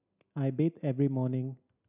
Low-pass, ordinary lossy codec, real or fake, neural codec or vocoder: 3.6 kHz; none; real; none